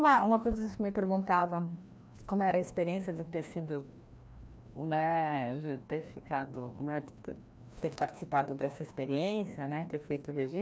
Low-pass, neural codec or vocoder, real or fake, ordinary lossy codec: none; codec, 16 kHz, 1 kbps, FreqCodec, larger model; fake; none